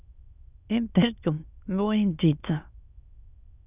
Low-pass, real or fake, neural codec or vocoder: 3.6 kHz; fake; autoencoder, 22.05 kHz, a latent of 192 numbers a frame, VITS, trained on many speakers